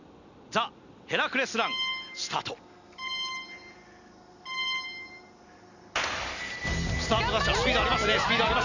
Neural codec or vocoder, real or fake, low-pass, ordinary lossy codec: none; real; 7.2 kHz; none